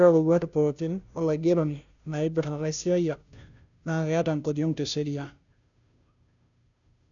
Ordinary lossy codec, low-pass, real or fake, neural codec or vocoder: none; 7.2 kHz; fake; codec, 16 kHz, 0.5 kbps, FunCodec, trained on Chinese and English, 25 frames a second